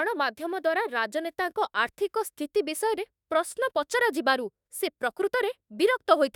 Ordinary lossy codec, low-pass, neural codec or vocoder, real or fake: none; 19.8 kHz; autoencoder, 48 kHz, 32 numbers a frame, DAC-VAE, trained on Japanese speech; fake